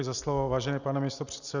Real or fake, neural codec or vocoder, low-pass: real; none; 7.2 kHz